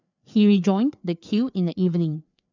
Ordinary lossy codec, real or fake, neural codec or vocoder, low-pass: none; fake; codec, 16 kHz, 4 kbps, FreqCodec, larger model; 7.2 kHz